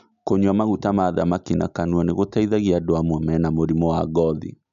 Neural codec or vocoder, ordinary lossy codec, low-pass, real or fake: none; none; 7.2 kHz; real